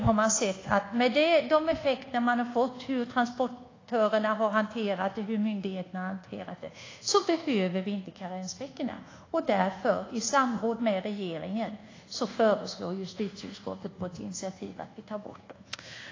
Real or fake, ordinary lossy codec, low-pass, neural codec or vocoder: fake; AAC, 32 kbps; 7.2 kHz; codec, 24 kHz, 1.2 kbps, DualCodec